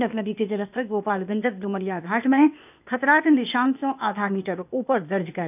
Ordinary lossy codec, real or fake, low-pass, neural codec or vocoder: none; fake; 3.6 kHz; codec, 16 kHz, 0.8 kbps, ZipCodec